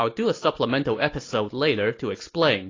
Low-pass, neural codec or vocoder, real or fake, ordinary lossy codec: 7.2 kHz; none; real; AAC, 32 kbps